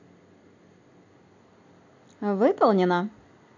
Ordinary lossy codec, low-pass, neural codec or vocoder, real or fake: AAC, 48 kbps; 7.2 kHz; none; real